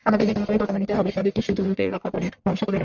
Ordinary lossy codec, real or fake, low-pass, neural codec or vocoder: Opus, 64 kbps; fake; 7.2 kHz; codec, 44.1 kHz, 1.7 kbps, Pupu-Codec